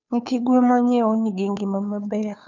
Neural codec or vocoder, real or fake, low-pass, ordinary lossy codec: codec, 16 kHz, 2 kbps, FunCodec, trained on Chinese and English, 25 frames a second; fake; 7.2 kHz; none